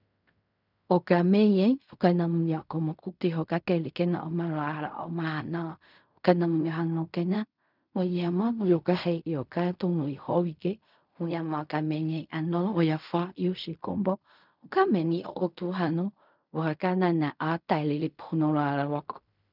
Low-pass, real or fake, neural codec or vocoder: 5.4 kHz; fake; codec, 16 kHz in and 24 kHz out, 0.4 kbps, LongCat-Audio-Codec, fine tuned four codebook decoder